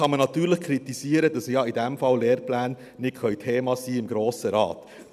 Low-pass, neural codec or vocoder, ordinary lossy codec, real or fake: 14.4 kHz; none; none; real